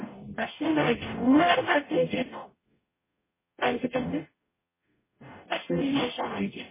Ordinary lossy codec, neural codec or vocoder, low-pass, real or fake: MP3, 16 kbps; codec, 44.1 kHz, 0.9 kbps, DAC; 3.6 kHz; fake